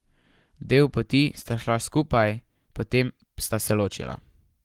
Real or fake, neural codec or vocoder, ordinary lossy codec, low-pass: fake; codec, 44.1 kHz, 7.8 kbps, Pupu-Codec; Opus, 24 kbps; 19.8 kHz